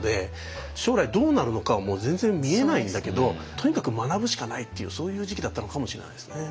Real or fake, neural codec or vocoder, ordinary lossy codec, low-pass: real; none; none; none